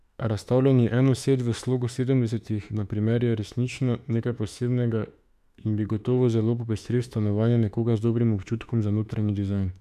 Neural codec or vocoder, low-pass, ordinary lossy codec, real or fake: autoencoder, 48 kHz, 32 numbers a frame, DAC-VAE, trained on Japanese speech; 14.4 kHz; none; fake